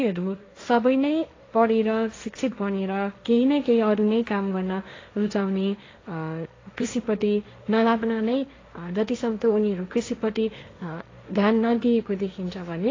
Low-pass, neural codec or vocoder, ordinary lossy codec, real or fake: 7.2 kHz; codec, 16 kHz, 1.1 kbps, Voila-Tokenizer; AAC, 32 kbps; fake